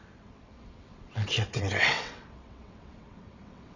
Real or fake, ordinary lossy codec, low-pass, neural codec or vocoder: fake; none; 7.2 kHz; vocoder, 22.05 kHz, 80 mel bands, WaveNeXt